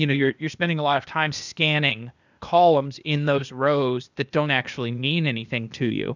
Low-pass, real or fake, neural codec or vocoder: 7.2 kHz; fake; codec, 16 kHz, 0.8 kbps, ZipCodec